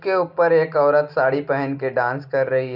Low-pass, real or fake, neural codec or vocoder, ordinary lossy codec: 5.4 kHz; real; none; none